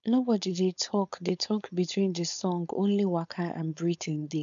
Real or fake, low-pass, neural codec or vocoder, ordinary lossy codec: fake; 7.2 kHz; codec, 16 kHz, 4.8 kbps, FACodec; none